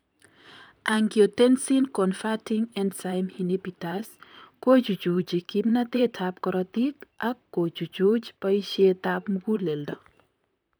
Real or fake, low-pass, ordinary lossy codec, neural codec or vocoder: fake; none; none; vocoder, 44.1 kHz, 128 mel bands, Pupu-Vocoder